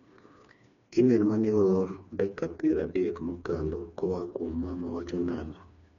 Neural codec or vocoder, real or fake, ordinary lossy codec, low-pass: codec, 16 kHz, 2 kbps, FreqCodec, smaller model; fake; none; 7.2 kHz